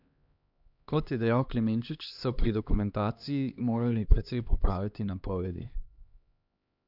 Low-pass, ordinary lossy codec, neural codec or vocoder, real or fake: 5.4 kHz; none; codec, 16 kHz, 2 kbps, X-Codec, HuBERT features, trained on LibriSpeech; fake